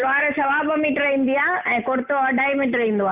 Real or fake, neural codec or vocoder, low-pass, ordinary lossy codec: real; none; 3.6 kHz; Opus, 24 kbps